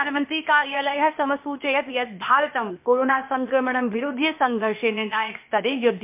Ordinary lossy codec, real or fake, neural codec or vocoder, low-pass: MP3, 32 kbps; fake; codec, 16 kHz, 0.8 kbps, ZipCodec; 3.6 kHz